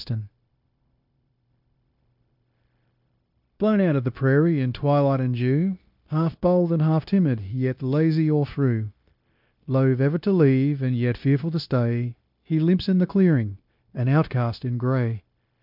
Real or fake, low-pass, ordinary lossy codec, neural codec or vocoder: fake; 5.4 kHz; MP3, 48 kbps; codec, 16 kHz, 0.9 kbps, LongCat-Audio-Codec